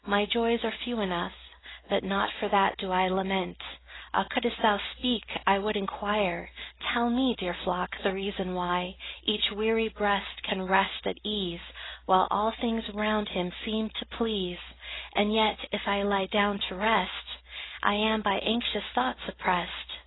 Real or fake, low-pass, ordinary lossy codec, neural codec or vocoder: real; 7.2 kHz; AAC, 16 kbps; none